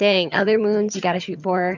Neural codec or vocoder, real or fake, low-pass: vocoder, 22.05 kHz, 80 mel bands, HiFi-GAN; fake; 7.2 kHz